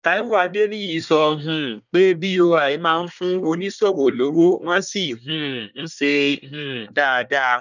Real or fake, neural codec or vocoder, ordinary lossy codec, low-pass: fake; codec, 24 kHz, 1 kbps, SNAC; none; 7.2 kHz